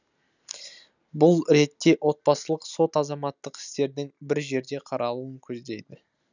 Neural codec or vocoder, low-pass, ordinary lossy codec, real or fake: none; 7.2 kHz; none; real